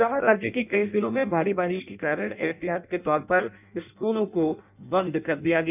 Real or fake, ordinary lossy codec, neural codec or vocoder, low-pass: fake; none; codec, 16 kHz in and 24 kHz out, 0.6 kbps, FireRedTTS-2 codec; 3.6 kHz